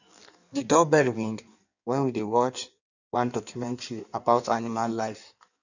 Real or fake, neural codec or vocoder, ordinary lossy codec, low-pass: fake; codec, 16 kHz in and 24 kHz out, 1.1 kbps, FireRedTTS-2 codec; none; 7.2 kHz